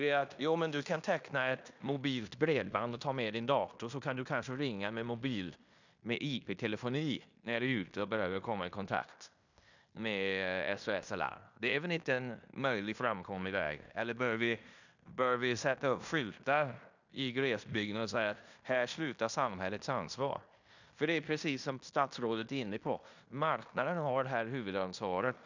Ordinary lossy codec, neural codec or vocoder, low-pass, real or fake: none; codec, 16 kHz in and 24 kHz out, 0.9 kbps, LongCat-Audio-Codec, fine tuned four codebook decoder; 7.2 kHz; fake